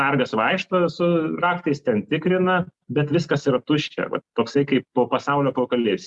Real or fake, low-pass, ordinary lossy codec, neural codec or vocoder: real; 10.8 kHz; Opus, 64 kbps; none